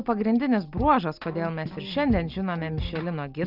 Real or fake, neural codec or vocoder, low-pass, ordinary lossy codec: real; none; 5.4 kHz; Opus, 24 kbps